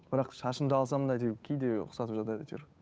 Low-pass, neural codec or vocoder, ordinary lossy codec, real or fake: none; codec, 16 kHz, 8 kbps, FunCodec, trained on Chinese and English, 25 frames a second; none; fake